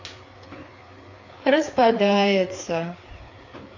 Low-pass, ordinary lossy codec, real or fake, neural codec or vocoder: 7.2 kHz; AAC, 48 kbps; fake; codec, 16 kHz, 4 kbps, FreqCodec, larger model